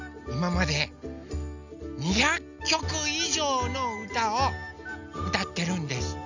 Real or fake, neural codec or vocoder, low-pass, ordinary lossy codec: real; none; 7.2 kHz; none